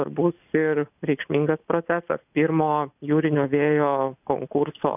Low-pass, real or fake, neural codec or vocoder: 3.6 kHz; real; none